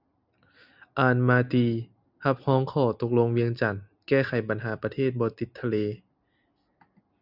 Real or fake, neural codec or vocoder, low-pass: real; none; 5.4 kHz